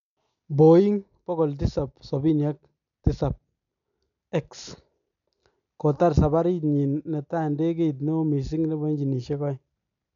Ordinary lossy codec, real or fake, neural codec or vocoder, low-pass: none; real; none; 7.2 kHz